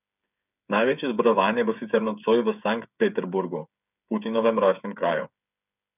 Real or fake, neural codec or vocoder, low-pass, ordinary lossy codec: fake; codec, 16 kHz, 8 kbps, FreqCodec, smaller model; 3.6 kHz; none